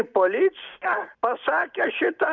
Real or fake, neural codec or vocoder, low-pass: real; none; 7.2 kHz